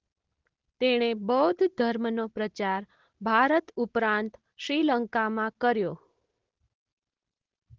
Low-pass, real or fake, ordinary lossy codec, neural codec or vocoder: 7.2 kHz; real; Opus, 16 kbps; none